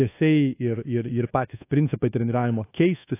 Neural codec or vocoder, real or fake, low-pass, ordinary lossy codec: codec, 24 kHz, 1.2 kbps, DualCodec; fake; 3.6 kHz; AAC, 24 kbps